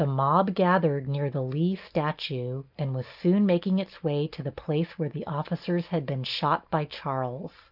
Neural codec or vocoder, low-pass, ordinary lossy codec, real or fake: none; 5.4 kHz; Opus, 32 kbps; real